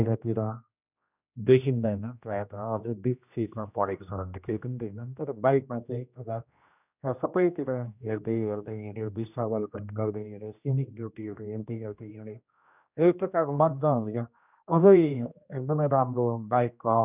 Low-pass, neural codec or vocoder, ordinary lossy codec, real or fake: 3.6 kHz; codec, 16 kHz, 1 kbps, X-Codec, HuBERT features, trained on general audio; none; fake